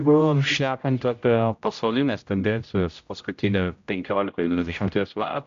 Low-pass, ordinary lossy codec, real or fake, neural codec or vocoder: 7.2 kHz; AAC, 64 kbps; fake; codec, 16 kHz, 0.5 kbps, X-Codec, HuBERT features, trained on general audio